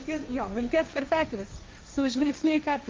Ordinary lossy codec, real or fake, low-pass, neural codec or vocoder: Opus, 32 kbps; fake; 7.2 kHz; codec, 16 kHz, 1.1 kbps, Voila-Tokenizer